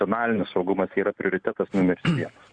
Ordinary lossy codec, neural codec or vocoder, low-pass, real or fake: Opus, 64 kbps; none; 9.9 kHz; real